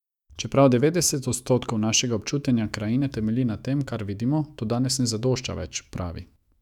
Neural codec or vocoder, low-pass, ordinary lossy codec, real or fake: autoencoder, 48 kHz, 128 numbers a frame, DAC-VAE, trained on Japanese speech; 19.8 kHz; none; fake